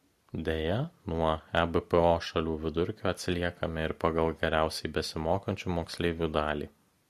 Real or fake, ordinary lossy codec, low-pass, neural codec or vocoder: real; MP3, 64 kbps; 14.4 kHz; none